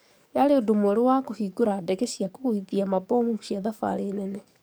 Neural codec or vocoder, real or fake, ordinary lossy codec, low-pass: codec, 44.1 kHz, 7.8 kbps, DAC; fake; none; none